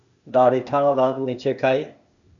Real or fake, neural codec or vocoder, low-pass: fake; codec, 16 kHz, 0.8 kbps, ZipCodec; 7.2 kHz